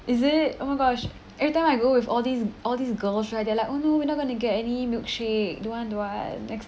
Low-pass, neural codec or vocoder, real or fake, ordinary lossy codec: none; none; real; none